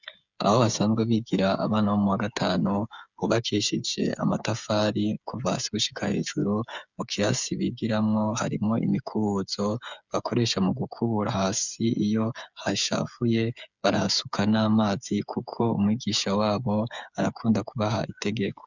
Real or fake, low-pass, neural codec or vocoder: fake; 7.2 kHz; codec, 16 kHz, 8 kbps, FreqCodec, smaller model